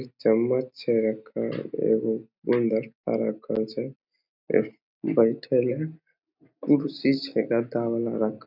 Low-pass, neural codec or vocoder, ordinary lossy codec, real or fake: 5.4 kHz; none; none; real